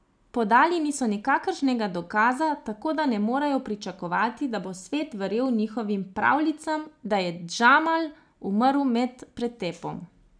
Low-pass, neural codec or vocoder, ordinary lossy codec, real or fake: 9.9 kHz; none; none; real